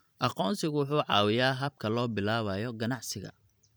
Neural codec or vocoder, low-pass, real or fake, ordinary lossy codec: vocoder, 44.1 kHz, 128 mel bands every 512 samples, BigVGAN v2; none; fake; none